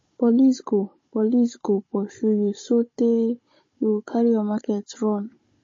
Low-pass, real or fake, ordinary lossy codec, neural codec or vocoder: 7.2 kHz; fake; MP3, 32 kbps; codec, 16 kHz, 16 kbps, FunCodec, trained on Chinese and English, 50 frames a second